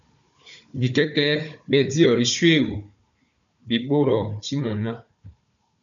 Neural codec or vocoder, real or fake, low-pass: codec, 16 kHz, 4 kbps, FunCodec, trained on Chinese and English, 50 frames a second; fake; 7.2 kHz